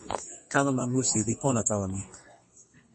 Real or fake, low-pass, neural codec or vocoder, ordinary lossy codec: fake; 10.8 kHz; codec, 32 kHz, 1.9 kbps, SNAC; MP3, 32 kbps